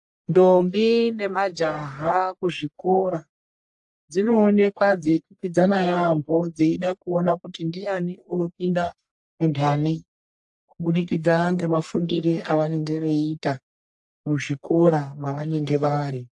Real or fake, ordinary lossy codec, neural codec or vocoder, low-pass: fake; AAC, 64 kbps; codec, 44.1 kHz, 1.7 kbps, Pupu-Codec; 10.8 kHz